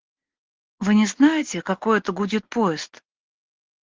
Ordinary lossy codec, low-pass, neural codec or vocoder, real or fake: Opus, 16 kbps; 7.2 kHz; none; real